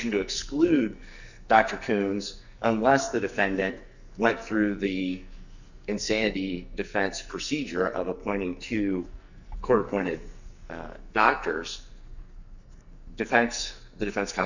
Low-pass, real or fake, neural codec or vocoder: 7.2 kHz; fake; codec, 44.1 kHz, 2.6 kbps, SNAC